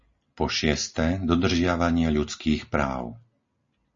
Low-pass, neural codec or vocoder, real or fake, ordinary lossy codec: 7.2 kHz; none; real; MP3, 32 kbps